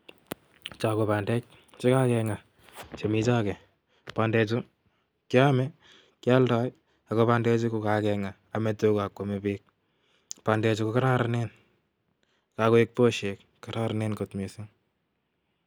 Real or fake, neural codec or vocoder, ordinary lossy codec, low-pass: real; none; none; none